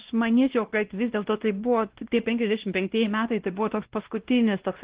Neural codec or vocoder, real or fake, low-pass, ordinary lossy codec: codec, 16 kHz, 1 kbps, X-Codec, WavLM features, trained on Multilingual LibriSpeech; fake; 3.6 kHz; Opus, 16 kbps